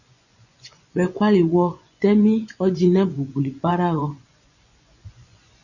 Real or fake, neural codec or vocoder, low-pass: fake; vocoder, 24 kHz, 100 mel bands, Vocos; 7.2 kHz